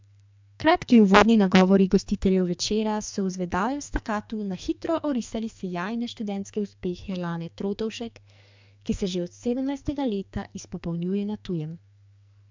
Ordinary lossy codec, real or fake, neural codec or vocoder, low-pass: MP3, 64 kbps; fake; codec, 44.1 kHz, 2.6 kbps, SNAC; 7.2 kHz